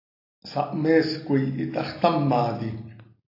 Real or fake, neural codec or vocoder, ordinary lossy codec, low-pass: real; none; AAC, 32 kbps; 5.4 kHz